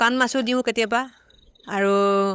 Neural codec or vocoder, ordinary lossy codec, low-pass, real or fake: codec, 16 kHz, 8 kbps, FunCodec, trained on LibriTTS, 25 frames a second; none; none; fake